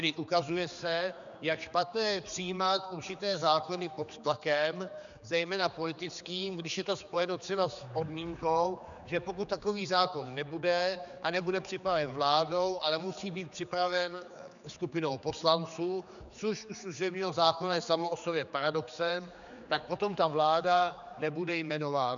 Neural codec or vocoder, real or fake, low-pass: codec, 16 kHz, 4 kbps, X-Codec, HuBERT features, trained on general audio; fake; 7.2 kHz